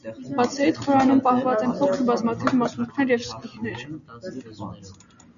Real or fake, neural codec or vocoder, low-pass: real; none; 7.2 kHz